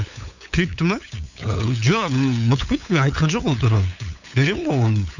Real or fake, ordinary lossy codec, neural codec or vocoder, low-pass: fake; none; codec, 16 kHz, 8 kbps, FunCodec, trained on LibriTTS, 25 frames a second; 7.2 kHz